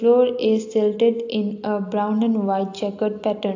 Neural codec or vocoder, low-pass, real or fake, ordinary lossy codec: none; 7.2 kHz; real; AAC, 48 kbps